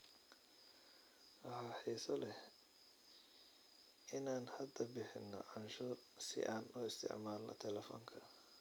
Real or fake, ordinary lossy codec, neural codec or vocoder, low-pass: real; none; none; none